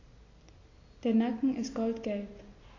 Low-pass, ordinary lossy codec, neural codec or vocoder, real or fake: 7.2 kHz; AAC, 32 kbps; none; real